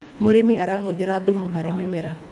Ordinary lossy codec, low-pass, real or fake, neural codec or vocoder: none; none; fake; codec, 24 kHz, 1.5 kbps, HILCodec